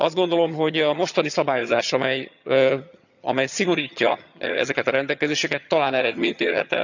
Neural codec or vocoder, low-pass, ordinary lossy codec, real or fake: vocoder, 22.05 kHz, 80 mel bands, HiFi-GAN; 7.2 kHz; none; fake